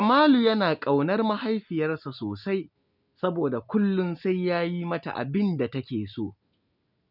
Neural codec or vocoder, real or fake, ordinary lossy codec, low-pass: none; real; none; 5.4 kHz